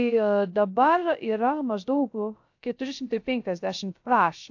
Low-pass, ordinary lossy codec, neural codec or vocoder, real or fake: 7.2 kHz; AAC, 48 kbps; codec, 16 kHz, 0.3 kbps, FocalCodec; fake